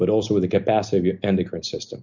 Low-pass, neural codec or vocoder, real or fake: 7.2 kHz; none; real